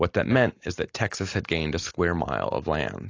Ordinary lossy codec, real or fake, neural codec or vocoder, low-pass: AAC, 32 kbps; real; none; 7.2 kHz